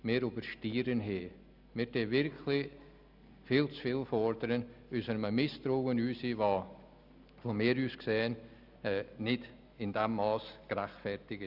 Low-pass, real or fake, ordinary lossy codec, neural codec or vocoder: 5.4 kHz; real; none; none